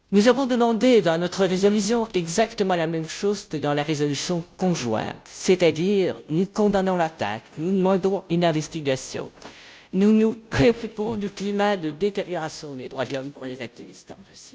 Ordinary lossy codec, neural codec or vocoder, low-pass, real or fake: none; codec, 16 kHz, 0.5 kbps, FunCodec, trained on Chinese and English, 25 frames a second; none; fake